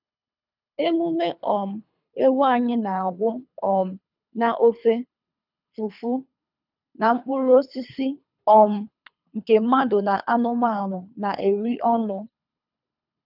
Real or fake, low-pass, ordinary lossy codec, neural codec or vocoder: fake; 5.4 kHz; none; codec, 24 kHz, 3 kbps, HILCodec